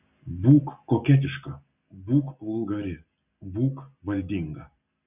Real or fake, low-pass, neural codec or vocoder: real; 3.6 kHz; none